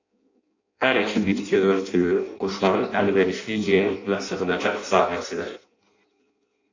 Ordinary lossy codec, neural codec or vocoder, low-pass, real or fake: AAC, 48 kbps; codec, 16 kHz in and 24 kHz out, 0.6 kbps, FireRedTTS-2 codec; 7.2 kHz; fake